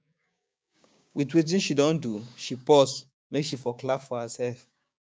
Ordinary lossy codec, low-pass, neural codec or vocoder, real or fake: none; none; codec, 16 kHz, 6 kbps, DAC; fake